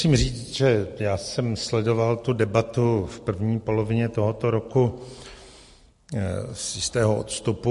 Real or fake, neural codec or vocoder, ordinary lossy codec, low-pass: real; none; MP3, 48 kbps; 14.4 kHz